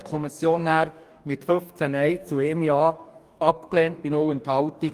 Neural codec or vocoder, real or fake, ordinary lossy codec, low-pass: codec, 44.1 kHz, 2.6 kbps, DAC; fake; Opus, 32 kbps; 14.4 kHz